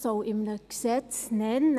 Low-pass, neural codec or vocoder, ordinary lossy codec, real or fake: 14.4 kHz; none; none; real